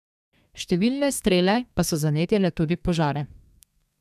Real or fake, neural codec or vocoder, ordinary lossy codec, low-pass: fake; codec, 32 kHz, 1.9 kbps, SNAC; none; 14.4 kHz